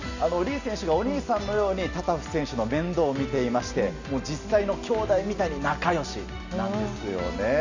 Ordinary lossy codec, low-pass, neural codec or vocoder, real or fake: none; 7.2 kHz; none; real